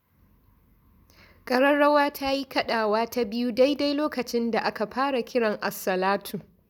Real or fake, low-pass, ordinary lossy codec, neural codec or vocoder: real; 19.8 kHz; none; none